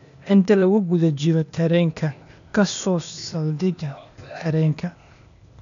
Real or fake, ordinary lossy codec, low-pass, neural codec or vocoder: fake; none; 7.2 kHz; codec, 16 kHz, 0.8 kbps, ZipCodec